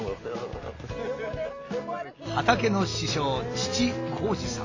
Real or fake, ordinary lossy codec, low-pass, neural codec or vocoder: real; AAC, 32 kbps; 7.2 kHz; none